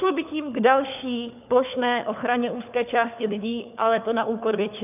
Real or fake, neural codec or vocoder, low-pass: fake; codec, 16 kHz, 4 kbps, FunCodec, trained on LibriTTS, 50 frames a second; 3.6 kHz